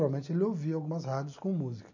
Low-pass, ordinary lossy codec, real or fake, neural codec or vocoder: 7.2 kHz; none; real; none